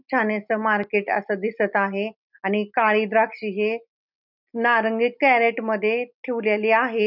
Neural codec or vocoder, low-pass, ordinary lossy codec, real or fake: none; 5.4 kHz; none; real